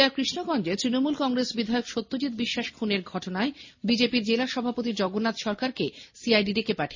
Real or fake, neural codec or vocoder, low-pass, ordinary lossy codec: real; none; 7.2 kHz; MP3, 32 kbps